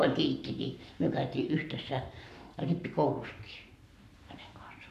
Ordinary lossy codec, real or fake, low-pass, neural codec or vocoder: none; fake; 14.4 kHz; codec, 44.1 kHz, 7.8 kbps, Pupu-Codec